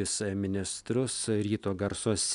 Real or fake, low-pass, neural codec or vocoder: real; 10.8 kHz; none